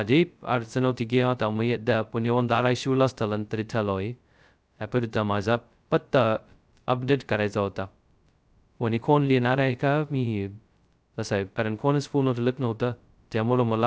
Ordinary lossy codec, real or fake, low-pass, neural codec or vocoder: none; fake; none; codec, 16 kHz, 0.2 kbps, FocalCodec